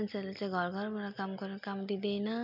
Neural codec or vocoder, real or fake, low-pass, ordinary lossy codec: none; real; 5.4 kHz; MP3, 48 kbps